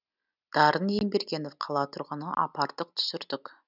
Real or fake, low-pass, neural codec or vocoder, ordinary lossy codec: real; 5.4 kHz; none; none